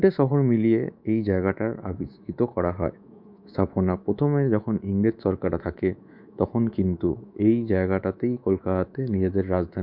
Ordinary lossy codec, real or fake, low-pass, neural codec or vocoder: MP3, 48 kbps; fake; 5.4 kHz; codec, 24 kHz, 3.1 kbps, DualCodec